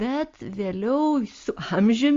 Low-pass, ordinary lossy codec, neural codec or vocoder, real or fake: 7.2 kHz; Opus, 32 kbps; none; real